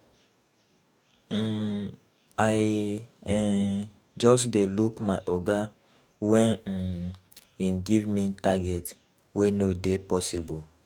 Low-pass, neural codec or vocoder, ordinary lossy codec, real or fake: 19.8 kHz; codec, 44.1 kHz, 2.6 kbps, DAC; none; fake